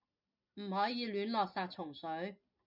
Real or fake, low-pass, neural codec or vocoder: real; 5.4 kHz; none